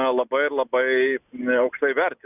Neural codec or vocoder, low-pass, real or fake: none; 3.6 kHz; real